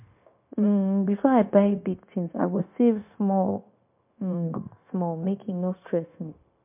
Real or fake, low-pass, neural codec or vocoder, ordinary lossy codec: fake; 3.6 kHz; codec, 16 kHz, 0.9 kbps, LongCat-Audio-Codec; MP3, 32 kbps